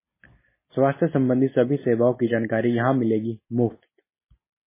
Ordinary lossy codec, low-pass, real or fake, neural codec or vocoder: MP3, 16 kbps; 3.6 kHz; real; none